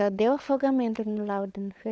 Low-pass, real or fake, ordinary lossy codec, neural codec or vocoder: none; fake; none; codec, 16 kHz, 16 kbps, FunCodec, trained on LibriTTS, 50 frames a second